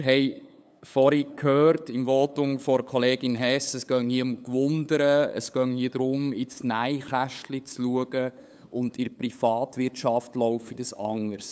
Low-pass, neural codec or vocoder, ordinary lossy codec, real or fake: none; codec, 16 kHz, 8 kbps, FunCodec, trained on LibriTTS, 25 frames a second; none; fake